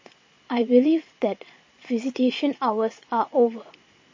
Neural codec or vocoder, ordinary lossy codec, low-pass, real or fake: vocoder, 22.05 kHz, 80 mel bands, Vocos; MP3, 32 kbps; 7.2 kHz; fake